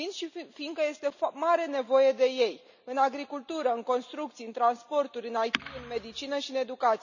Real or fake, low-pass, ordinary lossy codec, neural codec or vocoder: real; 7.2 kHz; none; none